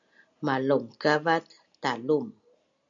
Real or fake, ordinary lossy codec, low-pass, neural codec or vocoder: real; MP3, 96 kbps; 7.2 kHz; none